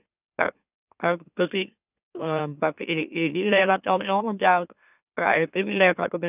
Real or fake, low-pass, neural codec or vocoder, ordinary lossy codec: fake; 3.6 kHz; autoencoder, 44.1 kHz, a latent of 192 numbers a frame, MeloTTS; none